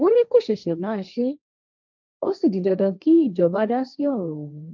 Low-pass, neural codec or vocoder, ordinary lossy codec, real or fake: 7.2 kHz; codec, 16 kHz, 1.1 kbps, Voila-Tokenizer; none; fake